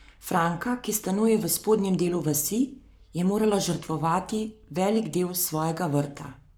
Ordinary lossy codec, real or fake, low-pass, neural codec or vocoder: none; fake; none; codec, 44.1 kHz, 7.8 kbps, Pupu-Codec